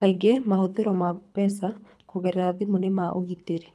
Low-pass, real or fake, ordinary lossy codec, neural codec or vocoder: none; fake; none; codec, 24 kHz, 3 kbps, HILCodec